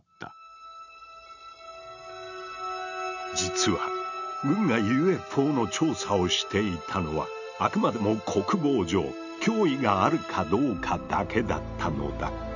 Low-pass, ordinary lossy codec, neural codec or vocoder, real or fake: 7.2 kHz; none; none; real